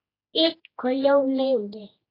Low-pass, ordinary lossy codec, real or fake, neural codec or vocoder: 5.4 kHz; AAC, 24 kbps; fake; codec, 16 kHz, 1 kbps, X-Codec, HuBERT features, trained on general audio